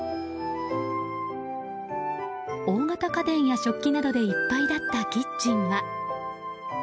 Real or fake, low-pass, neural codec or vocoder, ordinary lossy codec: real; none; none; none